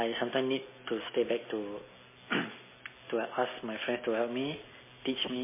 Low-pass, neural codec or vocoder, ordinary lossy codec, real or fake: 3.6 kHz; none; MP3, 16 kbps; real